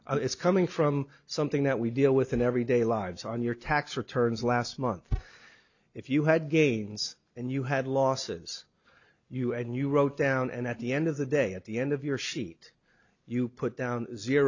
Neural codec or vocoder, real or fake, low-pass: none; real; 7.2 kHz